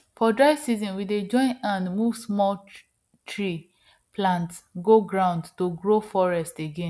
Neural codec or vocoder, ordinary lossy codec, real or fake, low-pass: none; none; real; none